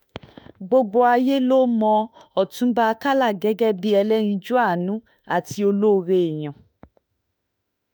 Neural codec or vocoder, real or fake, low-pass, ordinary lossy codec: autoencoder, 48 kHz, 32 numbers a frame, DAC-VAE, trained on Japanese speech; fake; none; none